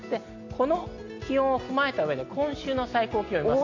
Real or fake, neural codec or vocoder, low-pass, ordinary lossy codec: real; none; 7.2 kHz; AAC, 48 kbps